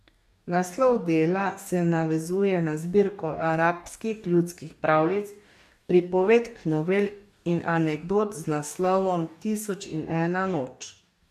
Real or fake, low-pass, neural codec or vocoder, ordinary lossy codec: fake; 14.4 kHz; codec, 44.1 kHz, 2.6 kbps, DAC; AAC, 96 kbps